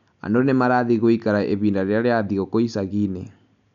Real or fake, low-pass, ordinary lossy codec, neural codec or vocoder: real; 7.2 kHz; none; none